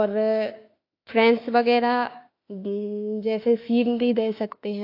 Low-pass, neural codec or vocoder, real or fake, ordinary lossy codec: 5.4 kHz; autoencoder, 48 kHz, 32 numbers a frame, DAC-VAE, trained on Japanese speech; fake; AAC, 32 kbps